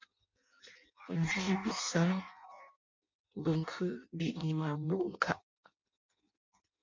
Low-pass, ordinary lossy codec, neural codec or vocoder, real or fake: 7.2 kHz; MP3, 48 kbps; codec, 16 kHz in and 24 kHz out, 0.6 kbps, FireRedTTS-2 codec; fake